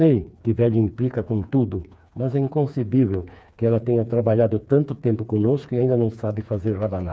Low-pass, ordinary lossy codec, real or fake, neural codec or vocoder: none; none; fake; codec, 16 kHz, 4 kbps, FreqCodec, smaller model